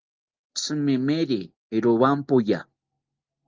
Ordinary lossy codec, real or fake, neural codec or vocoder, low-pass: Opus, 32 kbps; real; none; 7.2 kHz